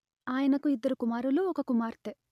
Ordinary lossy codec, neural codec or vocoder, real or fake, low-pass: none; none; real; 14.4 kHz